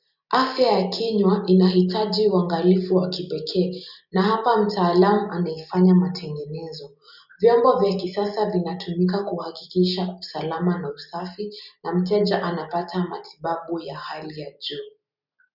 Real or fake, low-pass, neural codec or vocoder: real; 5.4 kHz; none